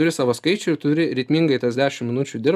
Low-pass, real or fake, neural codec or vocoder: 14.4 kHz; real; none